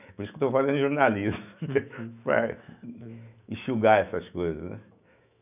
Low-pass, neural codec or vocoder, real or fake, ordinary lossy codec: 3.6 kHz; autoencoder, 48 kHz, 128 numbers a frame, DAC-VAE, trained on Japanese speech; fake; none